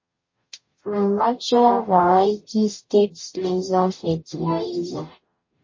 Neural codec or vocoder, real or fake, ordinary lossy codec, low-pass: codec, 44.1 kHz, 0.9 kbps, DAC; fake; MP3, 32 kbps; 7.2 kHz